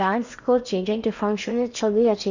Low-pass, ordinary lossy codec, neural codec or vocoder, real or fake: 7.2 kHz; none; codec, 16 kHz in and 24 kHz out, 0.8 kbps, FocalCodec, streaming, 65536 codes; fake